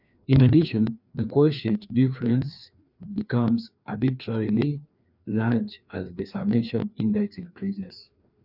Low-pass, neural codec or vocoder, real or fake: 5.4 kHz; codec, 16 kHz, 2 kbps, FreqCodec, larger model; fake